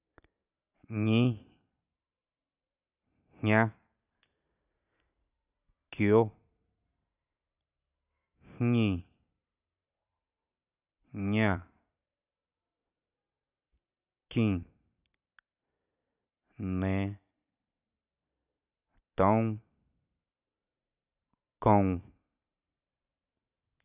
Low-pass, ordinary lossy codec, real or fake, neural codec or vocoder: 3.6 kHz; none; fake; vocoder, 44.1 kHz, 128 mel bands every 512 samples, BigVGAN v2